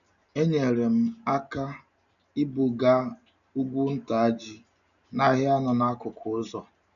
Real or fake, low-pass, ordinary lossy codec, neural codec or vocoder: real; 7.2 kHz; none; none